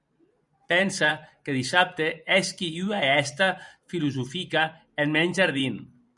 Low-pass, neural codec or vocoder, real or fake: 10.8 kHz; vocoder, 44.1 kHz, 128 mel bands every 512 samples, BigVGAN v2; fake